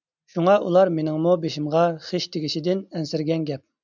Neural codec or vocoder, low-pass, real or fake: none; 7.2 kHz; real